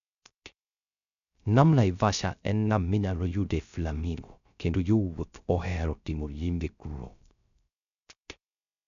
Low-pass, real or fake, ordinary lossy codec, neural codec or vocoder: 7.2 kHz; fake; none; codec, 16 kHz, 0.3 kbps, FocalCodec